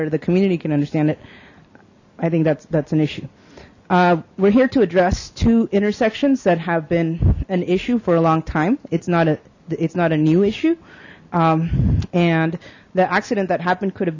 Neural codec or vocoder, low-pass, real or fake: none; 7.2 kHz; real